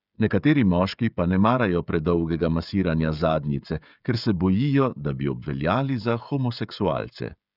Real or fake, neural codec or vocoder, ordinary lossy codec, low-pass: fake; codec, 16 kHz, 16 kbps, FreqCodec, smaller model; none; 5.4 kHz